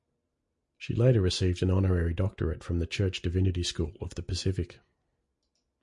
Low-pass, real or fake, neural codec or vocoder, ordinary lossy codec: 10.8 kHz; real; none; MP3, 48 kbps